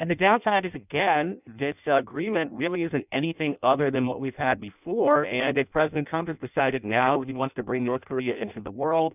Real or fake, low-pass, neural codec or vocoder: fake; 3.6 kHz; codec, 16 kHz in and 24 kHz out, 0.6 kbps, FireRedTTS-2 codec